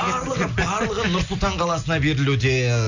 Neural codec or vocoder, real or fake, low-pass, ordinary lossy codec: none; real; 7.2 kHz; none